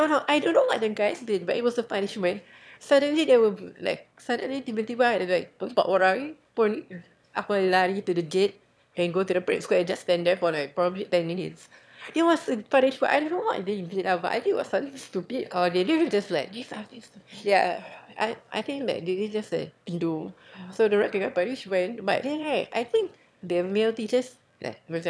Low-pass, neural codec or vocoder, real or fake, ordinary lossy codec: none; autoencoder, 22.05 kHz, a latent of 192 numbers a frame, VITS, trained on one speaker; fake; none